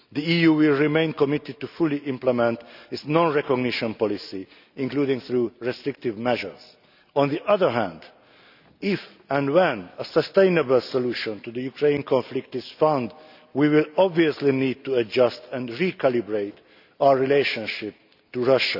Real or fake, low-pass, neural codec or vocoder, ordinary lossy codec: real; 5.4 kHz; none; none